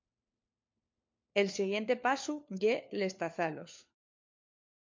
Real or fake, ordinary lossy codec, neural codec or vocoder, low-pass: fake; MP3, 48 kbps; codec, 16 kHz, 4 kbps, FunCodec, trained on LibriTTS, 50 frames a second; 7.2 kHz